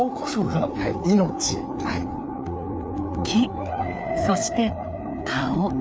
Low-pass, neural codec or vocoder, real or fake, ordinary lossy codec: none; codec, 16 kHz, 4 kbps, FreqCodec, larger model; fake; none